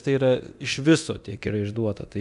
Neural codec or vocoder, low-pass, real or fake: codec, 24 kHz, 0.9 kbps, DualCodec; 10.8 kHz; fake